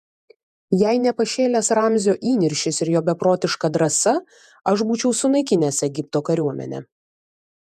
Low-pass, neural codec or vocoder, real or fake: 14.4 kHz; vocoder, 48 kHz, 128 mel bands, Vocos; fake